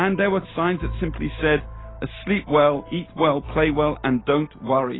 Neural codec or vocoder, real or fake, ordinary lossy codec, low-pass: none; real; AAC, 16 kbps; 7.2 kHz